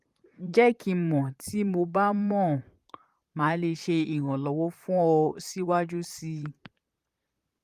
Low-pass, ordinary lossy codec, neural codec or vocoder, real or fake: 14.4 kHz; Opus, 32 kbps; vocoder, 44.1 kHz, 128 mel bands, Pupu-Vocoder; fake